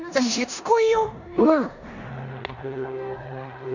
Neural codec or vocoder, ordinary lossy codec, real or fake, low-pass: codec, 16 kHz in and 24 kHz out, 0.9 kbps, LongCat-Audio-Codec, four codebook decoder; none; fake; 7.2 kHz